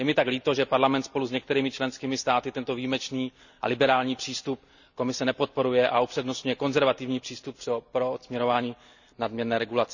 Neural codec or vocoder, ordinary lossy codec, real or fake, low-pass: none; none; real; 7.2 kHz